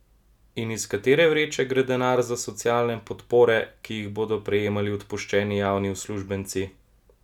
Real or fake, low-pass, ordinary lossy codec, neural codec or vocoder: real; 19.8 kHz; none; none